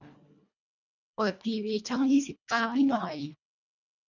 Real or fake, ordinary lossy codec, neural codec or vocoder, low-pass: fake; none; codec, 24 kHz, 1.5 kbps, HILCodec; 7.2 kHz